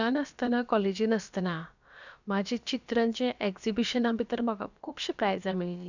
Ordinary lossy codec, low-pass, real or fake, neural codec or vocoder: none; 7.2 kHz; fake; codec, 16 kHz, about 1 kbps, DyCAST, with the encoder's durations